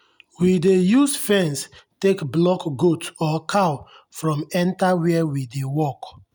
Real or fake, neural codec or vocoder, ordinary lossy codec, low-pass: real; none; none; none